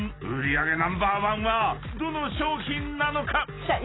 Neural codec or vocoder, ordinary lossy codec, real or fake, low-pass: none; AAC, 16 kbps; real; 7.2 kHz